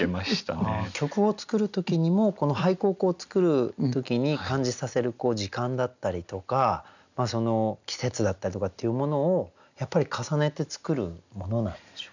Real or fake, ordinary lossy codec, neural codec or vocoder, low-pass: real; none; none; 7.2 kHz